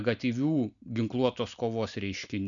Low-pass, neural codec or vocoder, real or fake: 7.2 kHz; none; real